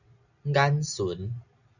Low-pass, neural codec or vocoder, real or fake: 7.2 kHz; none; real